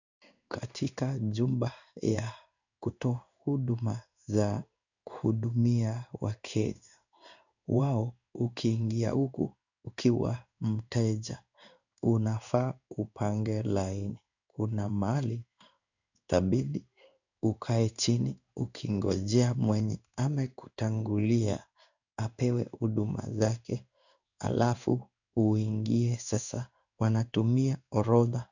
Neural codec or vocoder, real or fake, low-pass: codec, 16 kHz in and 24 kHz out, 1 kbps, XY-Tokenizer; fake; 7.2 kHz